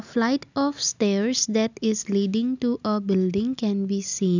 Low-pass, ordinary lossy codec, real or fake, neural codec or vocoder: 7.2 kHz; none; real; none